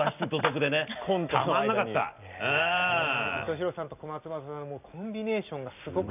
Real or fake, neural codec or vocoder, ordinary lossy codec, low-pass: real; none; none; 3.6 kHz